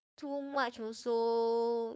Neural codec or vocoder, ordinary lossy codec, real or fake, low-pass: codec, 16 kHz, 4.8 kbps, FACodec; none; fake; none